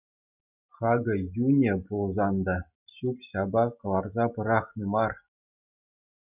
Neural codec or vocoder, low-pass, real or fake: none; 3.6 kHz; real